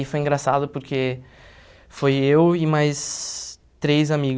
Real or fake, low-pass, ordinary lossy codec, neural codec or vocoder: real; none; none; none